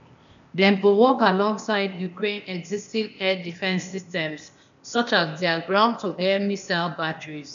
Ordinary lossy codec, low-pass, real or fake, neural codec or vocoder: none; 7.2 kHz; fake; codec, 16 kHz, 0.8 kbps, ZipCodec